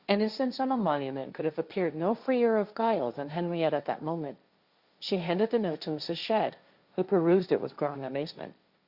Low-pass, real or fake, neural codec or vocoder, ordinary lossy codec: 5.4 kHz; fake; codec, 16 kHz, 1.1 kbps, Voila-Tokenizer; Opus, 64 kbps